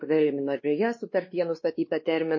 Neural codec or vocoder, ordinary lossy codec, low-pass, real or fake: codec, 16 kHz, 2 kbps, X-Codec, WavLM features, trained on Multilingual LibriSpeech; MP3, 32 kbps; 7.2 kHz; fake